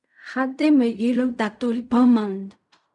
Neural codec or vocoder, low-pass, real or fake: codec, 16 kHz in and 24 kHz out, 0.4 kbps, LongCat-Audio-Codec, fine tuned four codebook decoder; 10.8 kHz; fake